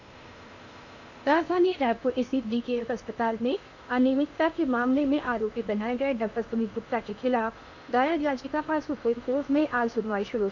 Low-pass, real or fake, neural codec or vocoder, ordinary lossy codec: 7.2 kHz; fake; codec, 16 kHz in and 24 kHz out, 0.8 kbps, FocalCodec, streaming, 65536 codes; none